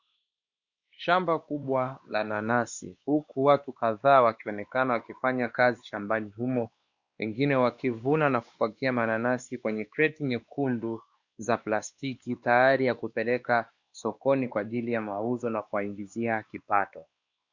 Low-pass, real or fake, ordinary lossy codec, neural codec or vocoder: 7.2 kHz; fake; Opus, 64 kbps; codec, 16 kHz, 2 kbps, X-Codec, WavLM features, trained on Multilingual LibriSpeech